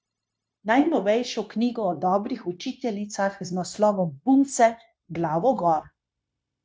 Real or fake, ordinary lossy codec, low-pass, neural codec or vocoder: fake; none; none; codec, 16 kHz, 0.9 kbps, LongCat-Audio-Codec